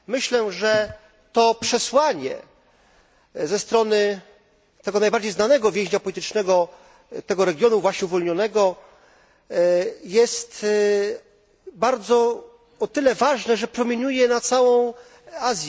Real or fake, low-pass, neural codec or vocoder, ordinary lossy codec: real; none; none; none